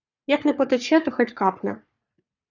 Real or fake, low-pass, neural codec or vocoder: fake; 7.2 kHz; codec, 44.1 kHz, 3.4 kbps, Pupu-Codec